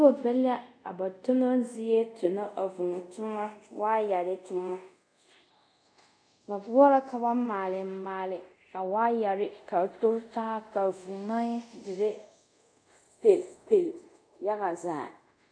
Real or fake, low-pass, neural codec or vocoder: fake; 9.9 kHz; codec, 24 kHz, 0.5 kbps, DualCodec